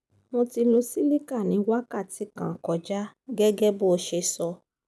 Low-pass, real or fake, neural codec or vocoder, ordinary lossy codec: none; real; none; none